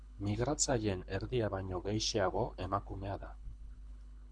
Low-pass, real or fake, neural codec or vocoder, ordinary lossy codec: 9.9 kHz; fake; codec, 24 kHz, 6 kbps, HILCodec; Opus, 64 kbps